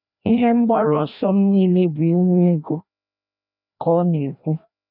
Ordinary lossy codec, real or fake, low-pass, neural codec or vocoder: none; fake; 5.4 kHz; codec, 16 kHz, 1 kbps, FreqCodec, larger model